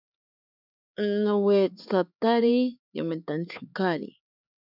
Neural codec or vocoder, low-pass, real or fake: codec, 16 kHz, 4 kbps, X-Codec, HuBERT features, trained on LibriSpeech; 5.4 kHz; fake